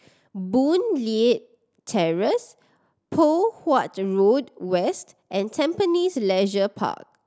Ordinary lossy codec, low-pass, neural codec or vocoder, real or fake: none; none; none; real